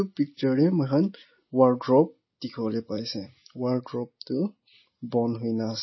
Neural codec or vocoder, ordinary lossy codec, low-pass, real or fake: none; MP3, 24 kbps; 7.2 kHz; real